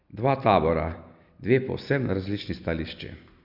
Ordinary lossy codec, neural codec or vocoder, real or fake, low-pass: Opus, 64 kbps; none; real; 5.4 kHz